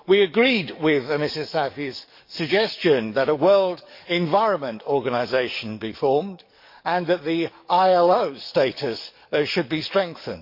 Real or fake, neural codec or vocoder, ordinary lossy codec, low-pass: fake; codec, 44.1 kHz, 7.8 kbps, DAC; MP3, 32 kbps; 5.4 kHz